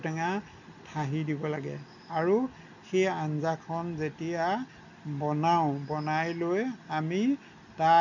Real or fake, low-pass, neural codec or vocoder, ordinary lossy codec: real; 7.2 kHz; none; none